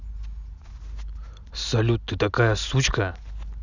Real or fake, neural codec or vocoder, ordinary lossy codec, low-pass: real; none; none; 7.2 kHz